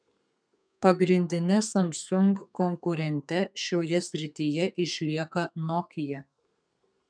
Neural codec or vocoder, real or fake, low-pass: codec, 32 kHz, 1.9 kbps, SNAC; fake; 9.9 kHz